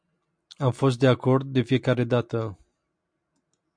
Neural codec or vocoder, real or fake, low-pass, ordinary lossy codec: none; real; 9.9 kHz; MP3, 64 kbps